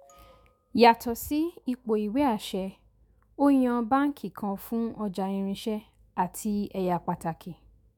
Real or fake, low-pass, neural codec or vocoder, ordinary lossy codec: fake; 19.8 kHz; autoencoder, 48 kHz, 128 numbers a frame, DAC-VAE, trained on Japanese speech; MP3, 96 kbps